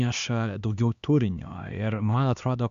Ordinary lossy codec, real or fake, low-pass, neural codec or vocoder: Opus, 64 kbps; fake; 7.2 kHz; codec, 16 kHz, 2 kbps, X-Codec, HuBERT features, trained on LibriSpeech